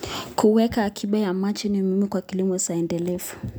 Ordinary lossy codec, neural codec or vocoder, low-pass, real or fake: none; none; none; real